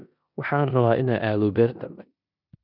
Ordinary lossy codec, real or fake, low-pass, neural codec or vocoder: none; fake; 5.4 kHz; codec, 16 kHz in and 24 kHz out, 0.9 kbps, LongCat-Audio-Codec, four codebook decoder